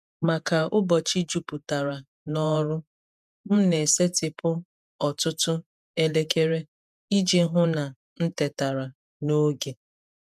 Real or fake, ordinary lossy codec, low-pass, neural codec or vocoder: fake; none; 14.4 kHz; vocoder, 48 kHz, 128 mel bands, Vocos